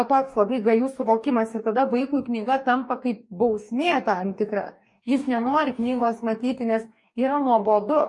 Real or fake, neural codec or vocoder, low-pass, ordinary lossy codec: fake; codec, 44.1 kHz, 2.6 kbps, DAC; 10.8 kHz; MP3, 48 kbps